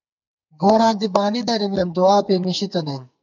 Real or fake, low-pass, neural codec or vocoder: fake; 7.2 kHz; codec, 44.1 kHz, 2.6 kbps, SNAC